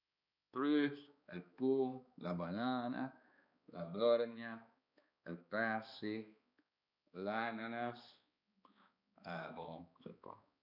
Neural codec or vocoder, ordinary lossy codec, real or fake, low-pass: codec, 16 kHz, 2 kbps, X-Codec, HuBERT features, trained on balanced general audio; none; fake; 5.4 kHz